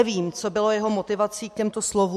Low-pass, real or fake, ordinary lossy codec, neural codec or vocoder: 14.4 kHz; real; MP3, 64 kbps; none